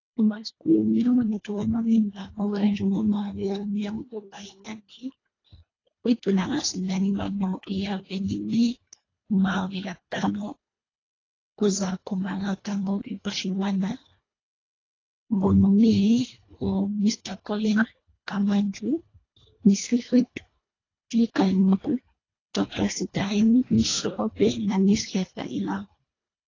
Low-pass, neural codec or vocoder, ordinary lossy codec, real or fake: 7.2 kHz; codec, 24 kHz, 1.5 kbps, HILCodec; AAC, 32 kbps; fake